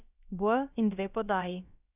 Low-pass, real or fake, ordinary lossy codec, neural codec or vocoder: 3.6 kHz; fake; none; codec, 16 kHz, about 1 kbps, DyCAST, with the encoder's durations